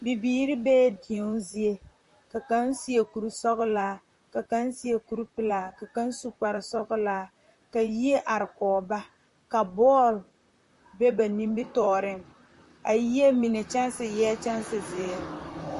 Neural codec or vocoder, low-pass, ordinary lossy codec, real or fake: vocoder, 44.1 kHz, 128 mel bands, Pupu-Vocoder; 14.4 kHz; MP3, 48 kbps; fake